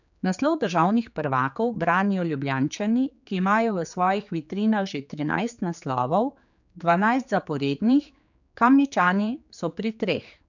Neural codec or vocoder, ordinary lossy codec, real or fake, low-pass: codec, 16 kHz, 4 kbps, X-Codec, HuBERT features, trained on general audio; none; fake; 7.2 kHz